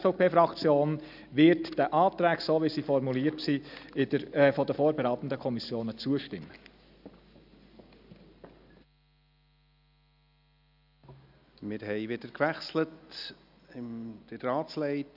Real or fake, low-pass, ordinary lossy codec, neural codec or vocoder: real; 5.4 kHz; none; none